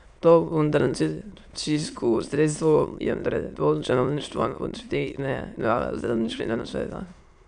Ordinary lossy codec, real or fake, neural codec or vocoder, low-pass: none; fake; autoencoder, 22.05 kHz, a latent of 192 numbers a frame, VITS, trained on many speakers; 9.9 kHz